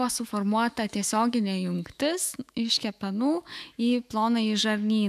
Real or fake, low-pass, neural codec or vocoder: fake; 14.4 kHz; autoencoder, 48 kHz, 128 numbers a frame, DAC-VAE, trained on Japanese speech